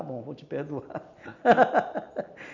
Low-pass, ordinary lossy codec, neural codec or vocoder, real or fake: 7.2 kHz; none; none; real